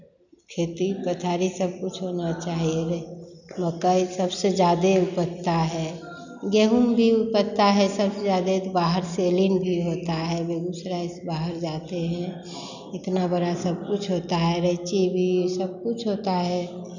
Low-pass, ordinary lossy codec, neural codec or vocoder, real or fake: 7.2 kHz; none; none; real